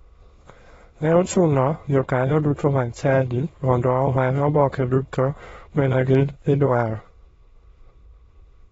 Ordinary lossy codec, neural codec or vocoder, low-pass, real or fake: AAC, 24 kbps; autoencoder, 22.05 kHz, a latent of 192 numbers a frame, VITS, trained on many speakers; 9.9 kHz; fake